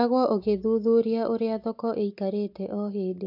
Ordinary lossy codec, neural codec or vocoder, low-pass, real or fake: AAC, 48 kbps; none; 5.4 kHz; real